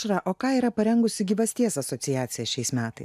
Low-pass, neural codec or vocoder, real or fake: 14.4 kHz; none; real